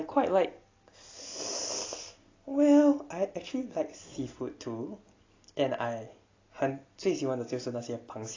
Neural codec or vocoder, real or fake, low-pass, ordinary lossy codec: none; real; 7.2 kHz; AAC, 32 kbps